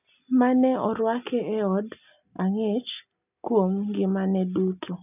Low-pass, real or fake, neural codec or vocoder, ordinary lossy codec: 3.6 kHz; real; none; none